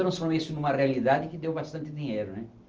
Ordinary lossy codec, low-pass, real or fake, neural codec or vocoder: Opus, 24 kbps; 7.2 kHz; real; none